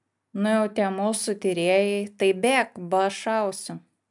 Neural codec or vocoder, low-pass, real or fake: none; 10.8 kHz; real